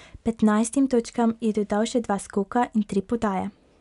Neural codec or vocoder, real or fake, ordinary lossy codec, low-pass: none; real; none; 10.8 kHz